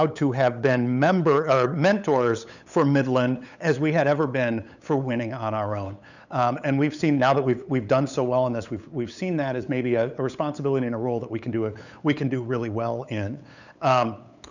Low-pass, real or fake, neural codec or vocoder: 7.2 kHz; fake; codec, 16 kHz, 8 kbps, FunCodec, trained on LibriTTS, 25 frames a second